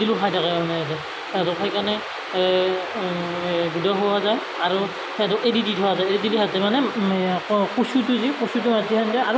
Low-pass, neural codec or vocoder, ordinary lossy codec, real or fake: none; none; none; real